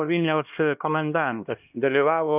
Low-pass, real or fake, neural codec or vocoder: 3.6 kHz; fake; codec, 16 kHz, 1 kbps, X-Codec, HuBERT features, trained on LibriSpeech